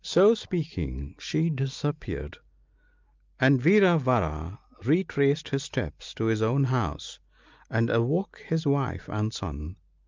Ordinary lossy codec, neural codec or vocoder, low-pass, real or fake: Opus, 24 kbps; none; 7.2 kHz; real